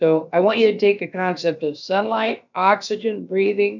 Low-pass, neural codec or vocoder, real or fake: 7.2 kHz; codec, 16 kHz, about 1 kbps, DyCAST, with the encoder's durations; fake